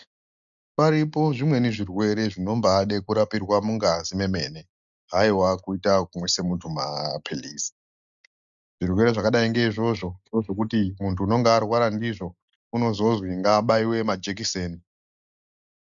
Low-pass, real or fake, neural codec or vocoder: 7.2 kHz; real; none